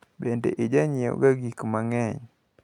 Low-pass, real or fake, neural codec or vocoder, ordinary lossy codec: 19.8 kHz; real; none; MP3, 96 kbps